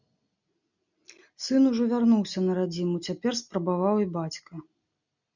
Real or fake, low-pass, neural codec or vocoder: real; 7.2 kHz; none